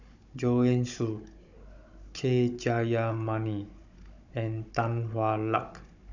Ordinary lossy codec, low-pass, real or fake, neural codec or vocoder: none; 7.2 kHz; fake; codec, 16 kHz, 16 kbps, FunCodec, trained on Chinese and English, 50 frames a second